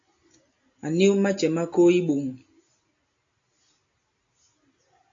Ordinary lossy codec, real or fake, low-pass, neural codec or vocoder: AAC, 48 kbps; real; 7.2 kHz; none